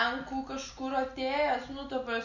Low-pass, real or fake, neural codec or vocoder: 7.2 kHz; real; none